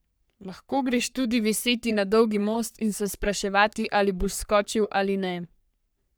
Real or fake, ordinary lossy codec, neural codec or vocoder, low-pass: fake; none; codec, 44.1 kHz, 3.4 kbps, Pupu-Codec; none